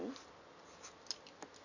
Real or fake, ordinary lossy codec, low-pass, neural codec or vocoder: real; none; 7.2 kHz; none